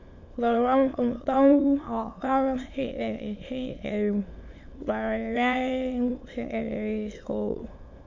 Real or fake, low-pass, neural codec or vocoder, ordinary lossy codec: fake; 7.2 kHz; autoencoder, 22.05 kHz, a latent of 192 numbers a frame, VITS, trained on many speakers; MP3, 48 kbps